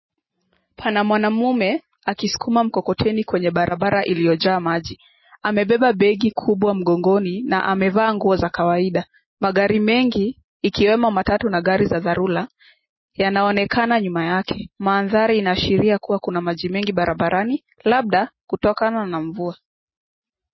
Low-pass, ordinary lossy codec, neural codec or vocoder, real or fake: 7.2 kHz; MP3, 24 kbps; none; real